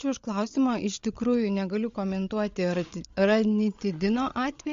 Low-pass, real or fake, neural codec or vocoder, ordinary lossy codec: 7.2 kHz; fake; codec, 16 kHz, 8 kbps, FreqCodec, larger model; MP3, 48 kbps